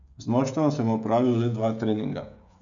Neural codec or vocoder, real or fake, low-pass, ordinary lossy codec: codec, 16 kHz, 16 kbps, FreqCodec, smaller model; fake; 7.2 kHz; none